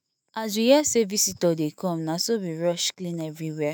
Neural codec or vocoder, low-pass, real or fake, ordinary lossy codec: autoencoder, 48 kHz, 128 numbers a frame, DAC-VAE, trained on Japanese speech; none; fake; none